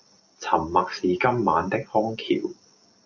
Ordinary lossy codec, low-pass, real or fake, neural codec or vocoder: AAC, 48 kbps; 7.2 kHz; real; none